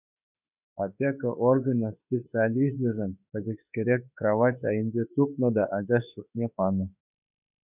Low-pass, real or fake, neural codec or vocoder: 3.6 kHz; fake; autoencoder, 48 kHz, 32 numbers a frame, DAC-VAE, trained on Japanese speech